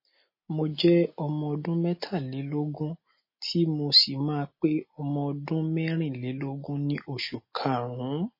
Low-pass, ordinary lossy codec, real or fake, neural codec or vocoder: 5.4 kHz; MP3, 24 kbps; real; none